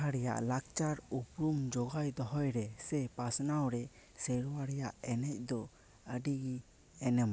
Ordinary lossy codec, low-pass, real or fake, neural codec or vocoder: none; none; real; none